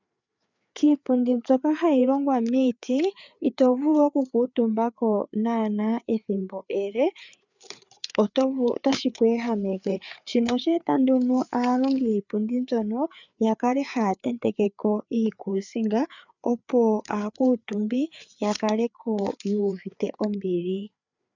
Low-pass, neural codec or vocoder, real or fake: 7.2 kHz; codec, 16 kHz, 4 kbps, FreqCodec, larger model; fake